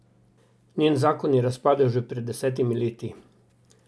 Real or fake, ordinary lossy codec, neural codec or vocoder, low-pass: real; none; none; none